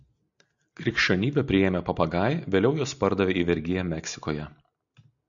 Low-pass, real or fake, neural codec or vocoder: 7.2 kHz; real; none